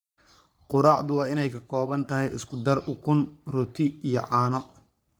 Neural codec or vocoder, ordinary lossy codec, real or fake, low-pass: codec, 44.1 kHz, 3.4 kbps, Pupu-Codec; none; fake; none